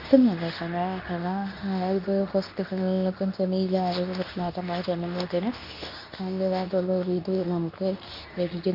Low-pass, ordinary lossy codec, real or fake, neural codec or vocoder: 5.4 kHz; none; fake; codec, 24 kHz, 0.9 kbps, WavTokenizer, medium speech release version 2